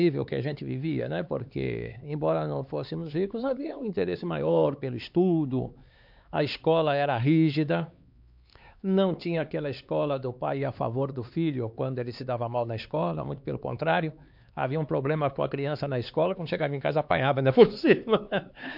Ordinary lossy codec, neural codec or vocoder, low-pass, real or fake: MP3, 48 kbps; codec, 16 kHz, 4 kbps, X-Codec, HuBERT features, trained on LibriSpeech; 5.4 kHz; fake